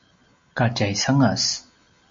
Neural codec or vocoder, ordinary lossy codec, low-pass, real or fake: none; MP3, 48 kbps; 7.2 kHz; real